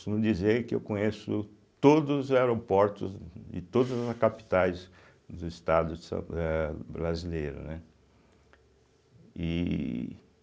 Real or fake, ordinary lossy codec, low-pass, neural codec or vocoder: real; none; none; none